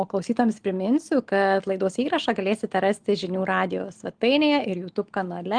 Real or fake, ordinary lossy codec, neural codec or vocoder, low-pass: real; Opus, 24 kbps; none; 9.9 kHz